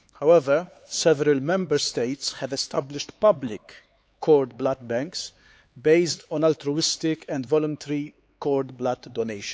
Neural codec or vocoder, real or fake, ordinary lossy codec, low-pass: codec, 16 kHz, 4 kbps, X-Codec, HuBERT features, trained on LibriSpeech; fake; none; none